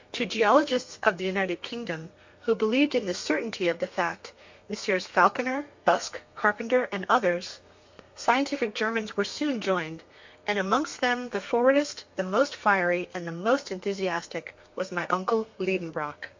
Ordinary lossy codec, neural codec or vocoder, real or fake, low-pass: MP3, 48 kbps; codec, 32 kHz, 1.9 kbps, SNAC; fake; 7.2 kHz